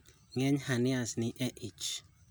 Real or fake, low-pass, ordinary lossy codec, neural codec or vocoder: real; none; none; none